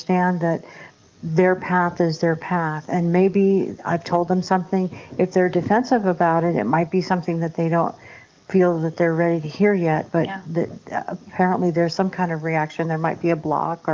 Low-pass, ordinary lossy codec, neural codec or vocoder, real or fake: 7.2 kHz; Opus, 24 kbps; codec, 44.1 kHz, 7.8 kbps, DAC; fake